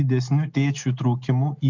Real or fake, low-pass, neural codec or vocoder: real; 7.2 kHz; none